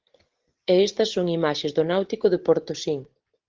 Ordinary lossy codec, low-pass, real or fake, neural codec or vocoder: Opus, 16 kbps; 7.2 kHz; real; none